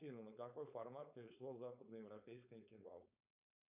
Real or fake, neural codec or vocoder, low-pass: fake; codec, 16 kHz, 4.8 kbps, FACodec; 3.6 kHz